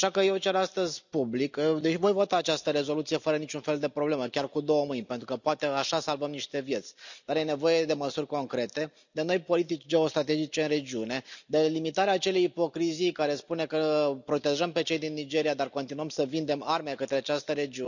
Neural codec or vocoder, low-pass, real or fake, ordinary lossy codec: none; 7.2 kHz; real; none